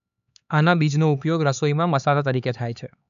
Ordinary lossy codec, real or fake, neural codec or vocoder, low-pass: none; fake; codec, 16 kHz, 4 kbps, X-Codec, HuBERT features, trained on LibriSpeech; 7.2 kHz